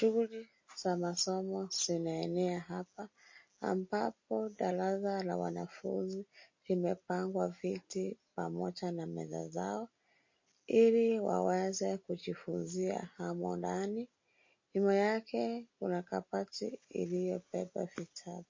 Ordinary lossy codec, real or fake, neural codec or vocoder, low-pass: MP3, 32 kbps; real; none; 7.2 kHz